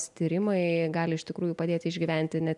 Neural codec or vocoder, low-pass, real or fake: none; 10.8 kHz; real